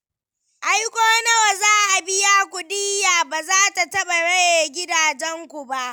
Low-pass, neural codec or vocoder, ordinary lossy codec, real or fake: none; none; none; real